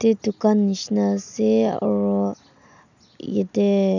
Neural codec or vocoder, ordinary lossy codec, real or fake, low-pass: none; none; real; 7.2 kHz